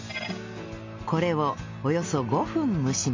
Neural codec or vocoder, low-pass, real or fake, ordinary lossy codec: none; 7.2 kHz; real; MP3, 48 kbps